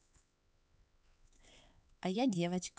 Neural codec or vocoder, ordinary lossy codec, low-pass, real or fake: codec, 16 kHz, 4 kbps, X-Codec, HuBERT features, trained on LibriSpeech; none; none; fake